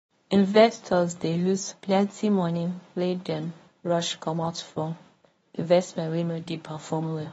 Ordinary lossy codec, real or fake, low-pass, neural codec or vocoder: AAC, 24 kbps; fake; 10.8 kHz; codec, 24 kHz, 0.9 kbps, WavTokenizer, medium speech release version 1